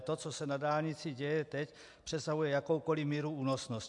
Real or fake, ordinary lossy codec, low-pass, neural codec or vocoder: real; MP3, 64 kbps; 10.8 kHz; none